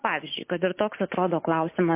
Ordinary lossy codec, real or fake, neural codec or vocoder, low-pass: MP3, 24 kbps; real; none; 3.6 kHz